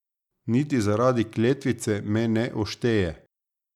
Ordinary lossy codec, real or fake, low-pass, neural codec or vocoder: none; real; 19.8 kHz; none